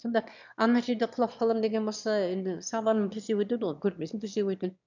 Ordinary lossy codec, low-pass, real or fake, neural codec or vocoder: none; 7.2 kHz; fake; autoencoder, 22.05 kHz, a latent of 192 numbers a frame, VITS, trained on one speaker